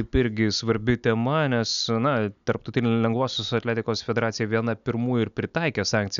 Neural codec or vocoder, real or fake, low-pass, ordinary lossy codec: none; real; 7.2 kHz; MP3, 96 kbps